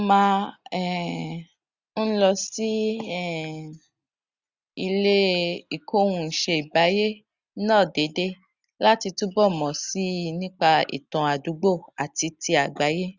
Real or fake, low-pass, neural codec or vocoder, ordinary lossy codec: real; 7.2 kHz; none; Opus, 64 kbps